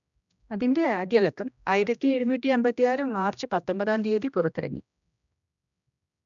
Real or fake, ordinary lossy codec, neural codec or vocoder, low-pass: fake; none; codec, 16 kHz, 1 kbps, X-Codec, HuBERT features, trained on general audio; 7.2 kHz